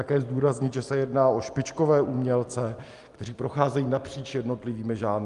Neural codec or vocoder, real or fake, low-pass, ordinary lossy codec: none; real; 10.8 kHz; Opus, 32 kbps